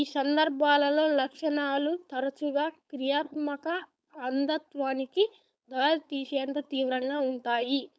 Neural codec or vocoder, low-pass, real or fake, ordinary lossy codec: codec, 16 kHz, 4.8 kbps, FACodec; none; fake; none